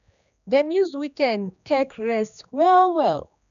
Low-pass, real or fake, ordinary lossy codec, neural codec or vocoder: 7.2 kHz; fake; none; codec, 16 kHz, 2 kbps, X-Codec, HuBERT features, trained on general audio